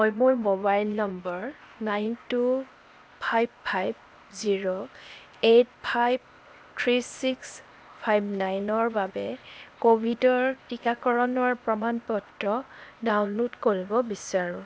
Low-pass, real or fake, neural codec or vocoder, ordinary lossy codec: none; fake; codec, 16 kHz, 0.8 kbps, ZipCodec; none